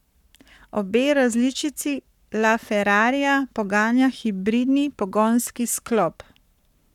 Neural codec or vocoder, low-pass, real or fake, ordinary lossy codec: codec, 44.1 kHz, 7.8 kbps, Pupu-Codec; 19.8 kHz; fake; none